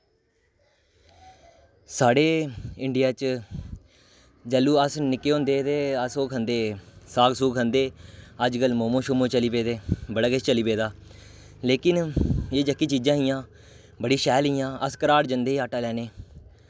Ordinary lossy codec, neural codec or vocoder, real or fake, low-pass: none; none; real; none